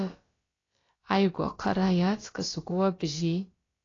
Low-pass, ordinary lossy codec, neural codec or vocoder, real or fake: 7.2 kHz; AAC, 32 kbps; codec, 16 kHz, about 1 kbps, DyCAST, with the encoder's durations; fake